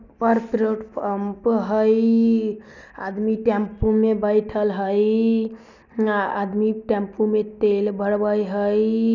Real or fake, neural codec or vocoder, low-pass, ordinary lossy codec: real; none; 7.2 kHz; none